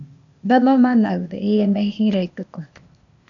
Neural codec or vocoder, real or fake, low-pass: codec, 16 kHz, 0.8 kbps, ZipCodec; fake; 7.2 kHz